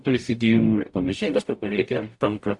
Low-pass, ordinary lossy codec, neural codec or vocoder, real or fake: 10.8 kHz; AAC, 64 kbps; codec, 44.1 kHz, 0.9 kbps, DAC; fake